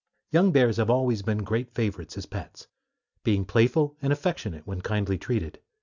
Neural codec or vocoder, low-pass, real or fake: none; 7.2 kHz; real